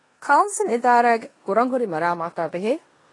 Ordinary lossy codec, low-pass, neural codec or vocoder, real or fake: AAC, 32 kbps; 10.8 kHz; codec, 16 kHz in and 24 kHz out, 0.9 kbps, LongCat-Audio-Codec, four codebook decoder; fake